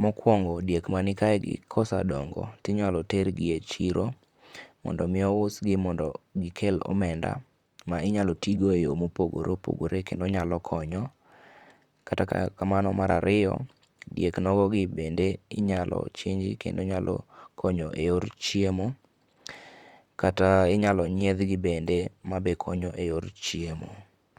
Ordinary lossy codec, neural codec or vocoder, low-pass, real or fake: Opus, 64 kbps; vocoder, 44.1 kHz, 128 mel bands, Pupu-Vocoder; 19.8 kHz; fake